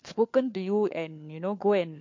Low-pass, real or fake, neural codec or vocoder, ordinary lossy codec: 7.2 kHz; fake; codec, 16 kHz, 4 kbps, FunCodec, trained on LibriTTS, 50 frames a second; MP3, 48 kbps